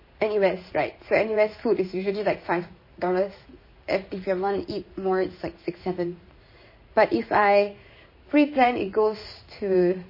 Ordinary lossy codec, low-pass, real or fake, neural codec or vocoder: MP3, 24 kbps; 5.4 kHz; fake; codec, 16 kHz in and 24 kHz out, 1 kbps, XY-Tokenizer